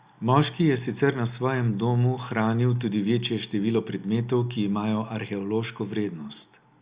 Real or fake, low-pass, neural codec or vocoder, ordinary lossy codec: real; 3.6 kHz; none; Opus, 64 kbps